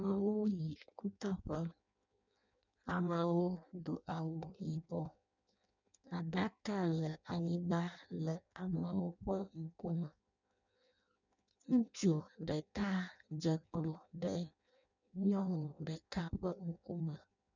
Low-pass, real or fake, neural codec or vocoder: 7.2 kHz; fake; codec, 16 kHz in and 24 kHz out, 0.6 kbps, FireRedTTS-2 codec